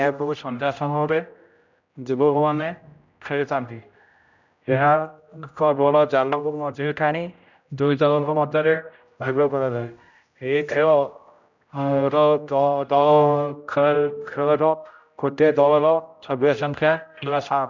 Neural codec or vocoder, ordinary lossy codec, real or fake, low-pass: codec, 16 kHz, 0.5 kbps, X-Codec, HuBERT features, trained on general audio; none; fake; 7.2 kHz